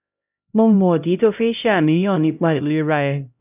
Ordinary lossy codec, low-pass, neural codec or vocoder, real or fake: none; 3.6 kHz; codec, 16 kHz, 0.5 kbps, X-Codec, HuBERT features, trained on LibriSpeech; fake